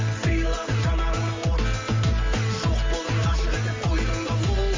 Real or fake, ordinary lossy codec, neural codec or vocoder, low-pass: real; Opus, 32 kbps; none; 7.2 kHz